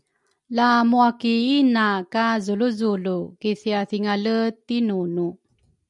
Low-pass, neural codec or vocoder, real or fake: 10.8 kHz; none; real